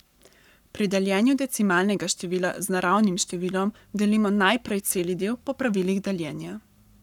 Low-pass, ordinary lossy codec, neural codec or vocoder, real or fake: 19.8 kHz; none; codec, 44.1 kHz, 7.8 kbps, Pupu-Codec; fake